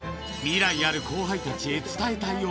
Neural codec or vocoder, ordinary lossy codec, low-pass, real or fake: none; none; none; real